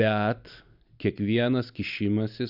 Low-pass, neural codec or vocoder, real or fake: 5.4 kHz; none; real